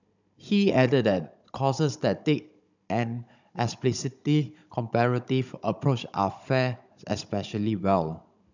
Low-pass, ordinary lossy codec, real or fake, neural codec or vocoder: 7.2 kHz; none; fake; codec, 16 kHz, 16 kbps, FunCodec, trained on Chinese and English, 50 frames a second